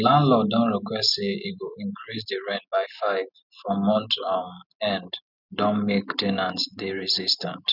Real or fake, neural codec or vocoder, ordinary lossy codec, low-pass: real; none; none; 5.4 kHz